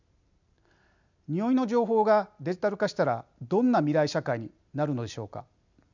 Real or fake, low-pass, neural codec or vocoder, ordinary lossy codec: real; 7.2 kHz; none; none